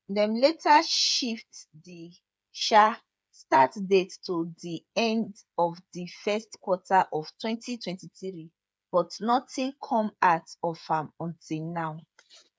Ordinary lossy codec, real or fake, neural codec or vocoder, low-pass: none; fake; codec, 16 kHz, 8 kbps, FreqCodec, smaller model; none